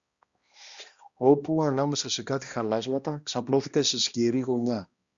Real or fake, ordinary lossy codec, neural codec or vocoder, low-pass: fake; Opus, 64 kbps; codec, 16 kHz, 1 kbps, X-Codec, HuBERT features, trained on balanced general audio; 7.2 kHz